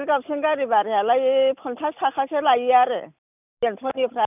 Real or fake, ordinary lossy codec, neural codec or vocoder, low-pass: real; none; none; 3.6 kHz